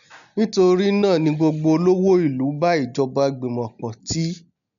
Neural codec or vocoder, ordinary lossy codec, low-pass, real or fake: none; none; 7.2 kHz; real